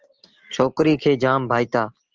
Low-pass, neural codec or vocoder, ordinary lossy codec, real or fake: 7.2 kHz; none; Opus, 16 kbps; real